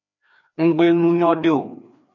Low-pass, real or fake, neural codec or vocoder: 7.2 kHz; fake; codec, 16 kHz, 2 kbps, FreqCodec, larger model